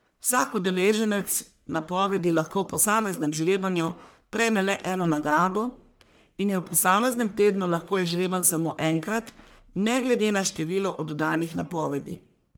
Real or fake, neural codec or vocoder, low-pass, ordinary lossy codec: fake; codec, 44.1 kHz, 1.7 kbps, Pupu-Codec; none; none